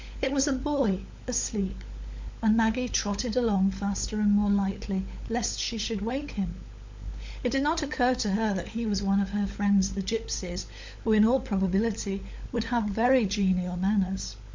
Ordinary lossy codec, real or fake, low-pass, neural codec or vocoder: MP3, 64 kbps; fake; 7.2 kHz; codec, 16 kHz, 16 kbps, FunCodec, trained on LibriTTS, 50 frames a second